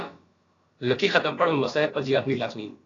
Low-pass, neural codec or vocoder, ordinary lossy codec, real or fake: 7.2 kHz; codec, 16 kHz, about 1 kbps, DyCAST, with the encoder's durations; AAC, 32 kbps; fake